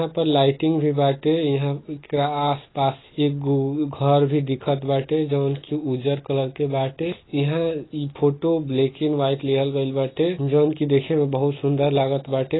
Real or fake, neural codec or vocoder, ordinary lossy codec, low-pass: real; none; AAC, 16 kbps; 7.2 kHz